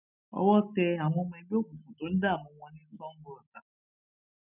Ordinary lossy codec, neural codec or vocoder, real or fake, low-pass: none; none; real; 3.6 kHz